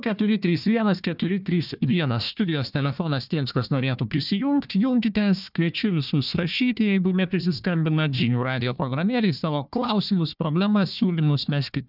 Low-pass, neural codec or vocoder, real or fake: 5.4 kHz; codec, 16 kHz, 1 kbps, FunCodec, trained on Chinese and English, 50 frames a second; fake